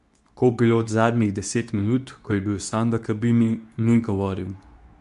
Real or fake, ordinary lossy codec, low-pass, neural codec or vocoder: fake; none; 10.8 kHz; codec, 24 kHz, 0.9 kbps, WavTokenizer, medium speech release version 2